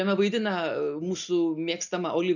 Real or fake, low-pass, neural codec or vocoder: real; 7.2 kHz; none